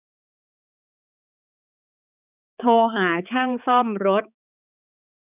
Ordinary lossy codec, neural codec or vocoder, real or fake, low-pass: none; codec, 16 kHz, 4 kbps, X-Codec, HuBERT features, trained on balanced general audio; fake; 3.6 kHz